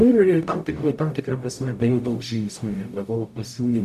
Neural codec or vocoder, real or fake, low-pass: codec, 44.1 kHz, 0.9 kbps, DAC; fake; 14.4 kHz